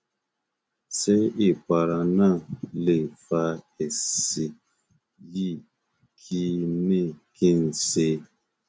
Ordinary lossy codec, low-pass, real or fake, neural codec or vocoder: none; none; real; none